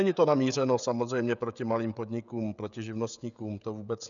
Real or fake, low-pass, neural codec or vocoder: fake; 7.2 kHz; codec, 16 kHz, 16 kbps, FreqCodec, smaller model